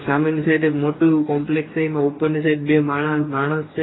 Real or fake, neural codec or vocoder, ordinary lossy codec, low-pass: fake; codec, 44.1 kHz, 2.6 kbps, DAC; AAC, 16 kbps; 7.2 kHz